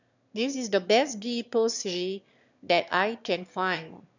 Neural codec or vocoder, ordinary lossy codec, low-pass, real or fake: autoencoder, 22.05 kHz, a latent of 192 numbers a frame, VITS, trained on one speaker; none; 7.2 kHz; fake